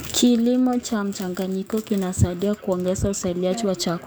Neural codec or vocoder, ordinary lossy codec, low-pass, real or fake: codec, 44.1 kHz, 7.8 kbps, DAC; none; none; fake